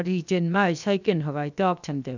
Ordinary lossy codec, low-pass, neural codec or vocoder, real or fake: none; 7.2 kHz; codec, 16 kHz, 0.7 kbps, FocalCodec; fake